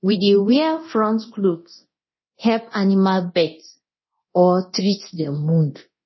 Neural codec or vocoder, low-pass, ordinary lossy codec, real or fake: codec, 24 kHz, 0.9 kbps, DualCodec; 7.2 kHz; MP3, 24 kbps; fake